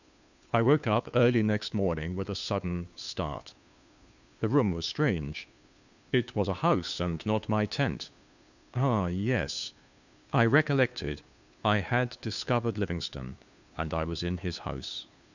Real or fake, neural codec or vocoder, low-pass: fake; codec, 16 kHz, 2 kbps, FunCodec, trained on Chinese and English, 25 frames a second; 7.2 kHz